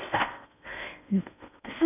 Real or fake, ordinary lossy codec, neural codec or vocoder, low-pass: fake; none; codec, 16 kHz in and 24 kHz out, 0.6 kbps, FocalCodec, streaming, 4096 codes; 3.6 kHz